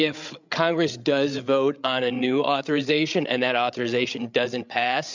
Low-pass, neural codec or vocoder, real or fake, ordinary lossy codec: 7.2 kHz; codec, 16 kHz, 8 kbps, FreqCodec, larger model; fake; MP3, 64 kbps